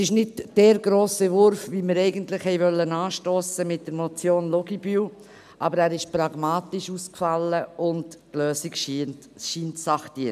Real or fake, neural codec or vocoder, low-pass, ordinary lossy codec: real; none; 14.4 kHz; none